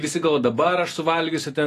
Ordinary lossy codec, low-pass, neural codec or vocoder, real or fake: AAC, 48 kbps; 14.4 kHz; none; real